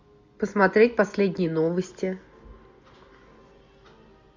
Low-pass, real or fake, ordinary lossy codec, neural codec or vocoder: 7.2 kHz; real; MP3, 64 kbps; none